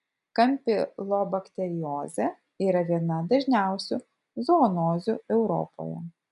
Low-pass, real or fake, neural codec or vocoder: 10.8 kHz; real; none